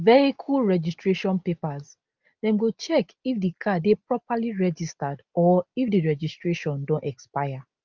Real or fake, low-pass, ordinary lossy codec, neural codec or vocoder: real; 7.2 kHz; Opus, 24 kbps; none